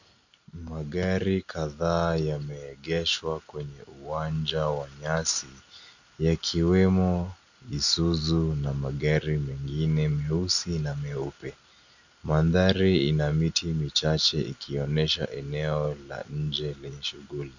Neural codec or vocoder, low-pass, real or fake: none; 7.2 kHz; real